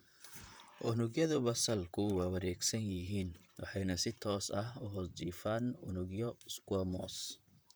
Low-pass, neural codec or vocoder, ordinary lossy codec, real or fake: none; vocoder, 44.1 kHz, 128 mel bands, Pupu-Vocoder; none; fake